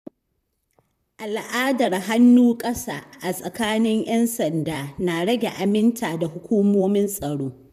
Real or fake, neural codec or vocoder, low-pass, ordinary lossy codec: fake; vocoder, 44.1 kHz, 128 mel bands, Pupu-Vocoder; 14.4 kHz; none